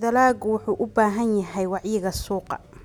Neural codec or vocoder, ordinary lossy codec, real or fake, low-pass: none; none; real; 19.8 kHz